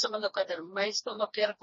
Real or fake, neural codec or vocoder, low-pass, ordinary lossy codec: fake; codec, 16 kHz, 2 kbps, FreqCodec, smaller model; 7.2 kHz; MP3, 32 kbps